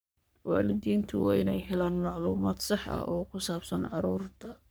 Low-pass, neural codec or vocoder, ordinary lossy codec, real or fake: none; codec, 44.1 kHz, 3.4 kbps, Pupu-Codec; none; fake